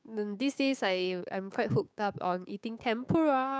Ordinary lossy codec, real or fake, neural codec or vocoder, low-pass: none; fake; codec, 16 kHz, 6 kbps, DAC; none